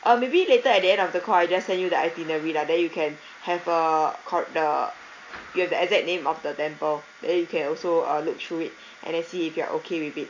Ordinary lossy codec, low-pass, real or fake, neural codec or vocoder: AAC, 48 kbps; 7.2 kHz; real; none